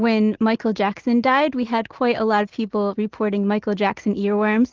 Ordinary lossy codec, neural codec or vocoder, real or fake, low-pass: Opus, 16 kbps; none; real; 7.2 kHz